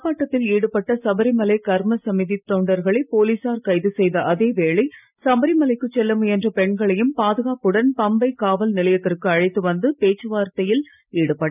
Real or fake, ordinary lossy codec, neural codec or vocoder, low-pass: real; none; none; 3.6 kHz